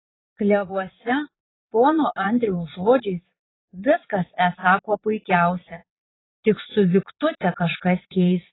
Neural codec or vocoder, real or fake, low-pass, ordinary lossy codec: vocoder, 22.05 kHz, 80 mel bands, Vocos; fake; 7.2 kHz; AAC, 16 kbps